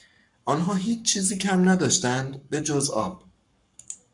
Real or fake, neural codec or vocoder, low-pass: fake; codec, 44.1 kHz, 7.8 kbps, Pupu-Codec; 10.8 kHz